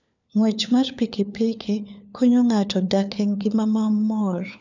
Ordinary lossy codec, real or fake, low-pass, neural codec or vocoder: none; fake; 7.2 kHz; codec, 16 kHz, 4 kbps, FunCodec, trained on LibriTTS, 50 frames a second